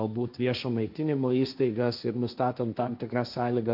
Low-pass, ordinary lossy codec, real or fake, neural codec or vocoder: 5.4 kHz; AAC, 48 kbps; fake; codec, 16 kHz, 1.1 kbps, Voila-Tokenizer